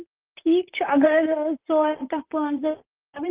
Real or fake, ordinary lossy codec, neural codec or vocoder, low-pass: fake; Opus, 32 kbps; vocoder, 44.1 kHz, 128 mel bands, Pupu-Vocoder; 3.6 kHz